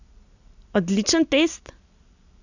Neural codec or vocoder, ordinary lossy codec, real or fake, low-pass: none; none; real; 7.2 kHz